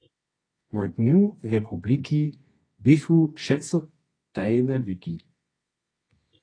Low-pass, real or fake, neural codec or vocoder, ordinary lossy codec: 9.9 kHz; fake; codec, 24 kHz, 0.9 kbps, WavTokenizer, medium music audio release; AAC, 32 kbps